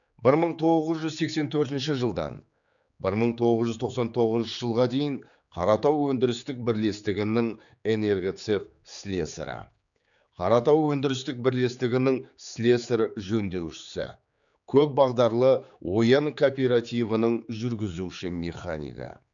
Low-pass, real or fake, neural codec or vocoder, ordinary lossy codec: 7.2 kHz; fake; codec, 16 kHz, 4 kbps, X-Codec, HuBERT features, trained on general audio; none